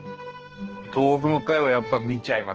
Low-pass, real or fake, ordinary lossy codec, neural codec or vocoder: 7.2 kHz; fake; Opus, 16 kbps; codec, 16 kHz, 2 kbps, X-Codec, HuBERT features, trained on balanced general audio